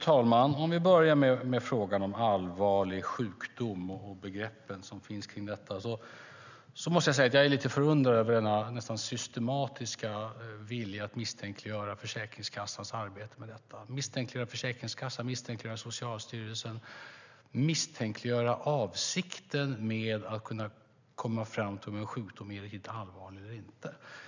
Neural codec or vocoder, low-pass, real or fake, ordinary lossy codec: none; 7.2 kHz; real; none